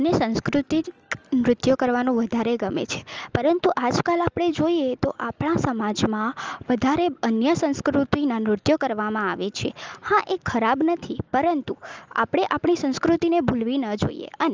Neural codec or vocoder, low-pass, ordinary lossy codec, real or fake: none; 7.2 kHz; Opus, 24 kbps; real